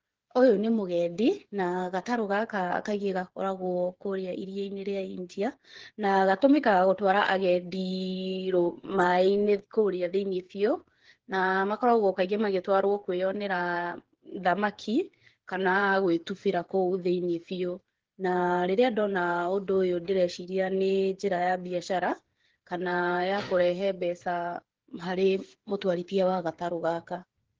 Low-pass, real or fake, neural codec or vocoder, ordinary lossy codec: 7.2 kHz; fake; codec, 16 kHz, 8 kbps, FreqCodec, smaller model; Opus, 16 kbps